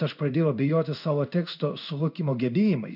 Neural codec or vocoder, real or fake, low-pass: codec, 16 kHz in and 24 kHz out, 1 kbps, XY-Tokenizer; fake; 5.4 kHz